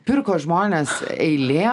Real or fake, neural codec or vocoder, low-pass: real; none; 10.8 kHz